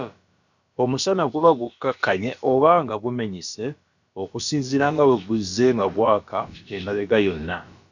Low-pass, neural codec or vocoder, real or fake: 7.2 kHz; codec, 16 kHz, about 1 kbps, DyCAST, with the encoder's durations; fake